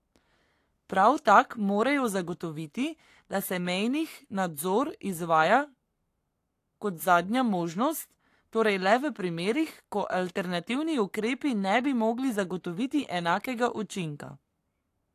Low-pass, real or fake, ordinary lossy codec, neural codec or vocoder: 14.4 kHz; fake; AAC, 64 kbps; codec, 44.1 kHz, 7.8 kbps, Pupu-Codec